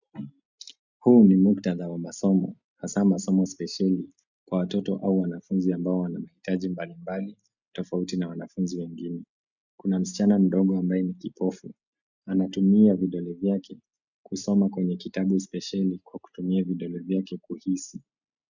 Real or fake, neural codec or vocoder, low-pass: real; none; 7.2 kHz